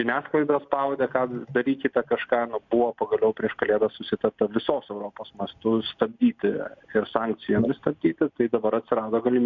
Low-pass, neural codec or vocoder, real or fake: 7.2 kHz; none; real